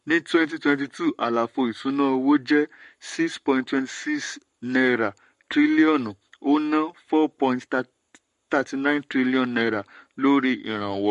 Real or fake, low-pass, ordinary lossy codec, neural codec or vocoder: fake; 14.4 kHz; MP3, 48 kbps; codec, 44.1 kHz, 7.8 kbps, Pupu-Codec